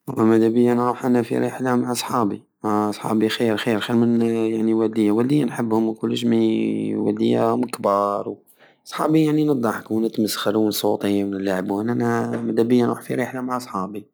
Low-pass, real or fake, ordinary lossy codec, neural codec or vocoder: none; real; none; none